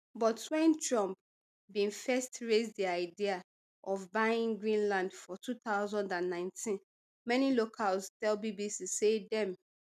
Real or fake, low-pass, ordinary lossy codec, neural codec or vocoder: real; 14.4 kHz; none; none